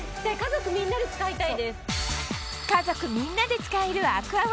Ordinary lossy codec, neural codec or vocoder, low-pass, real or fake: none; none; none; real